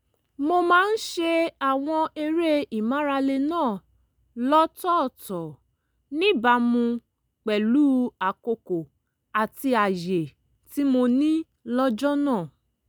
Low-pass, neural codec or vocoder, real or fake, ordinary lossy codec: none; none; real; none